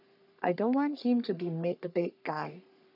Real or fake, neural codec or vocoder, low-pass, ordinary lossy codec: fake; codec, 44.1 kHz, 3.4 kbps, Pupu-Codec; 5.4 kHz; none